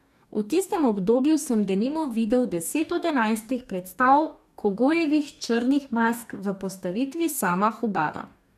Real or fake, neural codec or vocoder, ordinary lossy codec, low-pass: fake; codec, 44.1 kHz, 2.6 kbps, DAC; none; 14.4 kHz